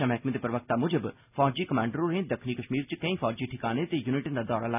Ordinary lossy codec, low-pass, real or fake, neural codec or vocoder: none; 3.6 kHz; real; none